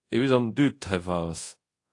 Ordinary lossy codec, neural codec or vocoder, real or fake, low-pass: AAC, 48 kbps; codec, 24 kHz, 0.5 kbps, DualCodec; fake; 10.8 kHz